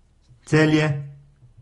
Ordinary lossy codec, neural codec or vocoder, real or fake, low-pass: AAC, 32 kbps; none; real; 10.8 kHz